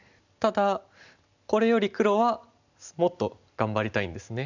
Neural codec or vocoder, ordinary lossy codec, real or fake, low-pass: none; none; real; 7.2 kHz